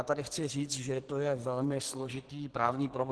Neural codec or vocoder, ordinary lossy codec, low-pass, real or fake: codec, 44.1 kHz, 2.6 kbps, SNAC; Opus, 16 kbps; 10.8 kHz; fake